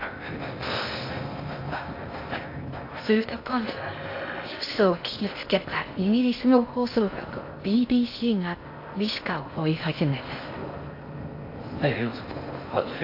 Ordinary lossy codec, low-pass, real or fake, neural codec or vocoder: AAC, 32 kbps; 5.4 kHz; fake; codec, 16 kHz in and 24 kHz out, 0.6 kbps, FocalCodec, streaming, 2048 codes